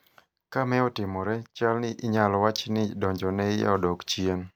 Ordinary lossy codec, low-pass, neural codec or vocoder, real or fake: none; none; none; real